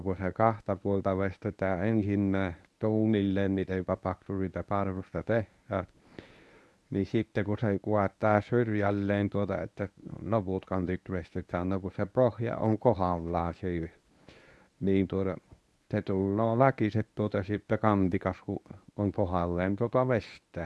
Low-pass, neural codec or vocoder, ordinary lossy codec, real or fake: none; codec, 24 kHz, 0.9 kbps, WavTokenizer, medium speech release version 1; none; fake